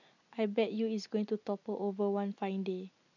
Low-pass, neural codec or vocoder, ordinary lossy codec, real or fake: 7.2 kHz; none; none; real